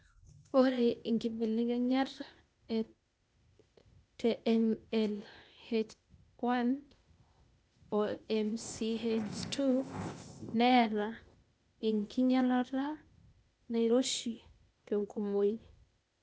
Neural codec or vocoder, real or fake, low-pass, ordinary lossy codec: codec, 16 kHz, 0.8 kbps, ZipCodec; fake; none; none